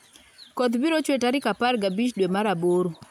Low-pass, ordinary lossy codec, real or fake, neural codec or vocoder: 19.8 kHz; none; real; none